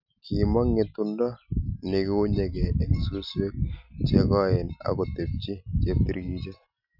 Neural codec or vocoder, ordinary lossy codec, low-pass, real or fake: none; none; 5.4 kHz; real